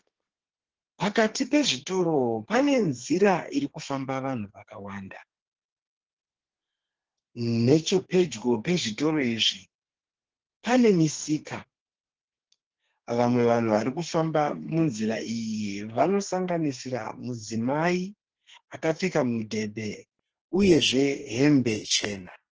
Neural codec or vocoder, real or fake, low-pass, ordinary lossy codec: codec, 32 kHz, 1.9 kbps, SNAC; fake; 7.2 kHz; Opus, 16 kbps